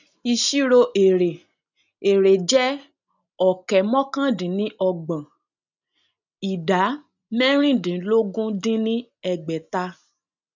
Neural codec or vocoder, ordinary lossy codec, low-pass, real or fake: none; none; 7.2 kHz; real